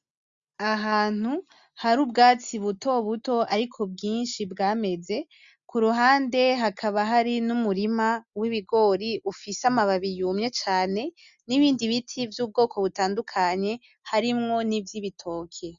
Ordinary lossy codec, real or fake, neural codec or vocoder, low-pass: Opus, 64 kbps; real; none; 7.2 kHz